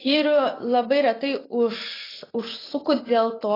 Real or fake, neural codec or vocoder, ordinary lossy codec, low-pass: fake; vocoder, 24 kHz, 100 mel bands, Vocos; AAC, 24 kbps; 5.4 kHz